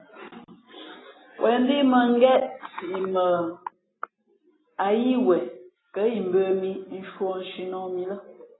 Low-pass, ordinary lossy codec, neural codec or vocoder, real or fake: 7.2 kHz; AAC, 16 kbps; none; real